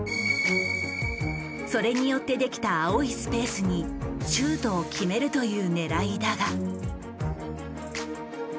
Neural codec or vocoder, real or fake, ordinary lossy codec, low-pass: none; real; none; none